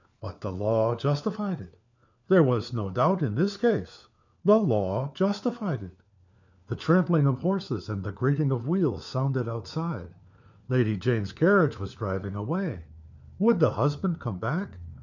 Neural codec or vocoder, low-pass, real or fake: codec, 16 kHz, 4 kbps, FunCodec, trained on LibriTTS, 50 frames a second; 7.2 kHz; fake